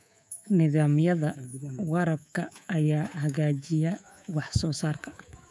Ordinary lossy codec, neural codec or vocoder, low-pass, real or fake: none; codec, 24 kHz, 3.1 kbps, DualCodec; none; fake